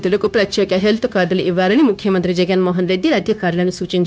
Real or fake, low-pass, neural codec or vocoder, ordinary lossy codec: fake; none; codec, 16 kHz, 0.9 kbps, LongCat-Audio-Codec; none